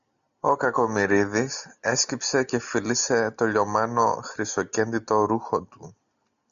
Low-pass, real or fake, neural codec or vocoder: 7.2 kHz; real; none